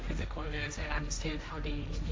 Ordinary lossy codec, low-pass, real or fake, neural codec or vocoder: none; none; fake; codec, 16 kHz, 1.1 kbps, Voila-Tokenizer